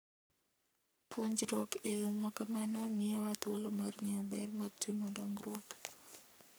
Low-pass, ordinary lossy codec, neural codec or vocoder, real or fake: none; none; codec, 44.1 kHz, 3.4 kbps, Pupu-Codec; fake